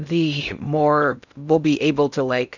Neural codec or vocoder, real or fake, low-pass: codec, 16 kHz in and 24 kHz out, 0.6 kbps, FocalCodec, streaming, 2048 codes; fake; 7.2 kHz